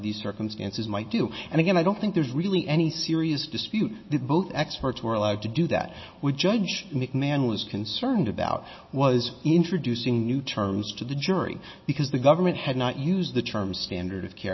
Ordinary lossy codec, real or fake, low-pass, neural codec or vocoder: MP3, 24 kbps; real; 7.2 kHz; none